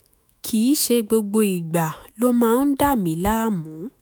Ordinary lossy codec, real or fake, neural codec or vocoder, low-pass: none; fake; autoencoder, 48 kHz, 128 numbers a frame, DAC-VAE, trained on Japanese speech; none